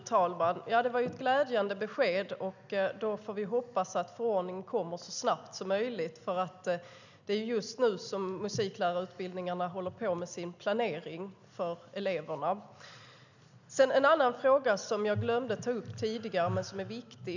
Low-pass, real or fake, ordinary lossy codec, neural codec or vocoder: 7.2 kHz; real; none; none